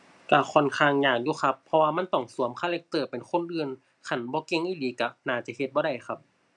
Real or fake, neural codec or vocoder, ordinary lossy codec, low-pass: real; none; none; 10.8 kHz